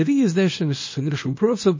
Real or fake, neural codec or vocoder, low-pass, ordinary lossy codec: fake; codec, 24 kHz, 0.9 kbps, WavTokenizer, small release; 7.2 kHz; MP3, 32 kbps